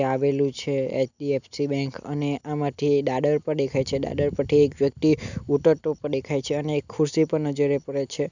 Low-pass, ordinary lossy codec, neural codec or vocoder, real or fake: 7.2 kHz; none; none; real